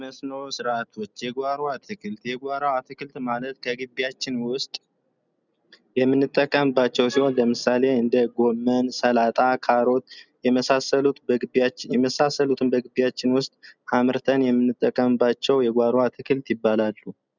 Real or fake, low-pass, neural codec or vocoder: real; 7.2 kHz; none